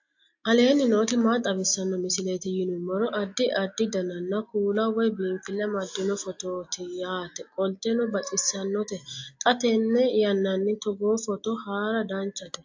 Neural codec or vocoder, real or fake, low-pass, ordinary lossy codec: none; real; 7.2 kHz; AAC, 48 kbps